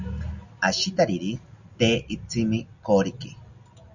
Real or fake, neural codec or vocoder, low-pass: real; none; 7.2 kHz